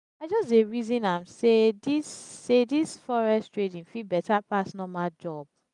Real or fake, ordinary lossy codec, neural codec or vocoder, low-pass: real; none; none; 10.8 kHz